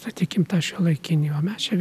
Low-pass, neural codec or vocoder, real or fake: 14.4 kHz; none; real